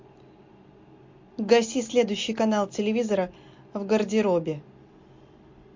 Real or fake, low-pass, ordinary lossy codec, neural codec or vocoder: real; 7.2 kHz; MP3, 48 kbps; none